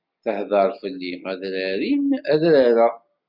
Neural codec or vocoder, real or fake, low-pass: none; real; 5.4 kHz